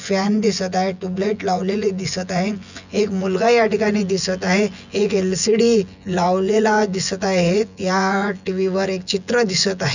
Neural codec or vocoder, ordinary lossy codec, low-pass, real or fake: vocoder, 24 kHz, 100 mel bands, Vocos; none; 7.2 kHz; fake